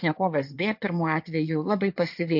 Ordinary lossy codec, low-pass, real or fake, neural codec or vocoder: AAC, 48 kbps; 5.4 kHz; fake; codec, 16 kHz in and 24 kHz out, 2.2 kbps, FireRedTTS-2 codec